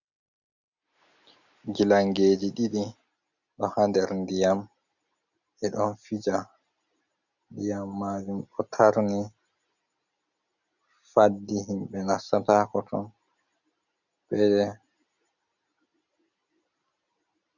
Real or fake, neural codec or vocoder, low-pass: real; none; 7.2 kHz